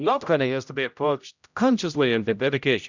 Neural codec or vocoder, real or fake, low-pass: codec, 16 kHz, 0.5 kbps, X-Codec, HuBERT features, trained on general audio; fake; 7.2 kHz